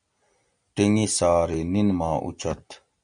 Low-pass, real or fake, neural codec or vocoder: 9.9 kHz; real; none